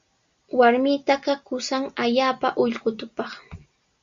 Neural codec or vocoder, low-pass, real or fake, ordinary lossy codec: none; 7.2 kHz; real; Opus, 64 kbps